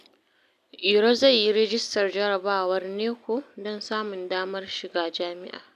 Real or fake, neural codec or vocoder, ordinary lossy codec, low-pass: real; none; MP3, 96 kbps; 14.4 kHz